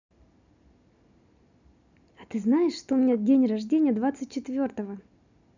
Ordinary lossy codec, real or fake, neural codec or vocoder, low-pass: none; real; none; 7.2 kHz